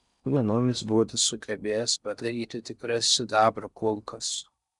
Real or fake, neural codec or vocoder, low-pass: fake; codec, 16 kHz in and 24 kHz out, 0.6 kbps, FocalCodec, streaming, 4096 codes; 10.8 kHz